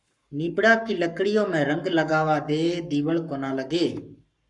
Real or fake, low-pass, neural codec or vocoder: fake; 10.8 kHz; codec, 44.1 kHz, 7.8 kbps, Pupu-Codec